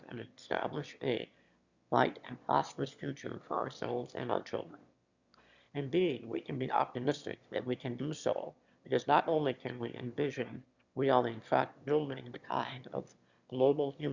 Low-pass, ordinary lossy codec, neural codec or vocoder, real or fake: 7.2 kHz; Opus, 64 kbps; autoencoder, 22.05 kHz, a latent of 192 numbers a frame, VITS, trained on one speaker; fake